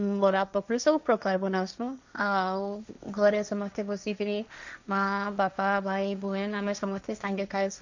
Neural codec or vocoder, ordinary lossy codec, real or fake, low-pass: codec, 16 kHz, 1.1 kbps, Voila-Tokenizer; none; fake; 7.2 kHz